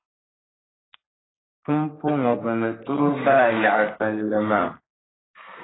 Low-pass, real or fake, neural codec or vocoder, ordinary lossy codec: 7.2 kHz; fake; codec, 32 kHz, 1.9 kbps, SNAC; AAC, 16 kbps